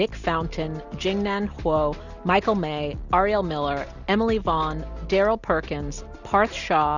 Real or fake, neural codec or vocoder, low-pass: real; none; 7.2 kHz